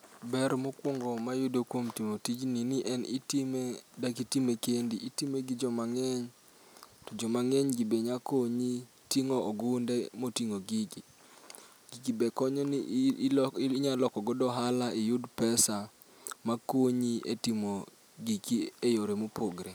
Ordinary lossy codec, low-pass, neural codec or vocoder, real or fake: none; none; none; real